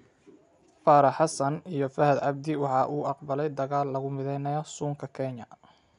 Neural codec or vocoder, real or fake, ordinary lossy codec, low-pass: none; real; none; 10.8 kHz